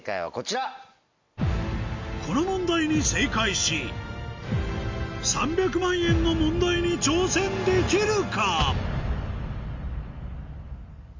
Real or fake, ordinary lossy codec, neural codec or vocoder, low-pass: real; MP3, 48 kbps; none; 7.2 kHz